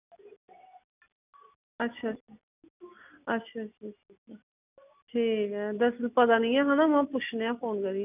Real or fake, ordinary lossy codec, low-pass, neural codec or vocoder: real; none; 3.6 kHz; none